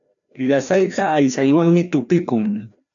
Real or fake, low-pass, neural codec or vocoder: fake; 7.2 kHz; codec, 16 kHz, 1 kbps, FreqCodec, larger model